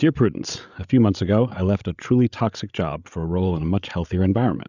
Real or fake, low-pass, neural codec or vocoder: fake; 7.2 kHz; codec, 16 kHz, 8 kbps, FreqCodec, larger model